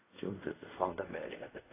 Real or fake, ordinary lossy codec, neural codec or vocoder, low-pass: fake; AAC, 16 kbps; codec, 16 kHz in and 24 kHz out, 0.4 kbps, LongCat-Audio-Codec, fine tuned four codebook decoder; 3.6 kHz